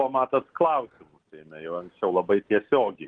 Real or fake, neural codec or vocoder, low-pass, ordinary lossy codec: real; none; 9.9 kHz; Opus, 16 kbps